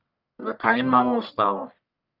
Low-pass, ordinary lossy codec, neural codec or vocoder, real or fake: 5.4 kHz; none; codec, 44.1 kHz, 1.7 kbps, Pupu-Codec; fake